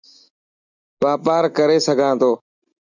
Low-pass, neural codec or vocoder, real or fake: 7.2 kHz; none; real